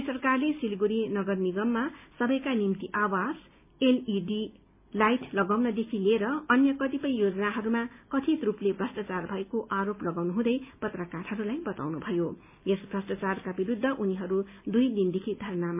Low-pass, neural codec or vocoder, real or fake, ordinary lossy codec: 3.6 kHz; none; real; MP3, 32 kbps